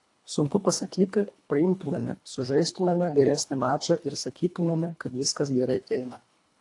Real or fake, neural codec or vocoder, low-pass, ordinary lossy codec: fake; codec, 24 kHz, 1.5 kbps, HILCodec; 10.8 kHz; AAC, 48 kbps